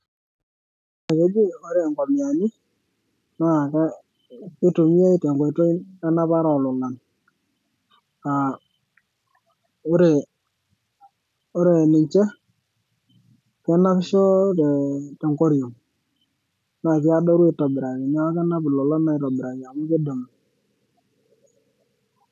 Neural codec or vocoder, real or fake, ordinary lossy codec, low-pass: none; real; none; 14.4 kHz